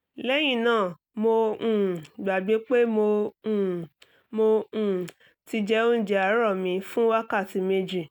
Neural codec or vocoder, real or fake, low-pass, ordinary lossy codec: none; real; 19.8 kHz; none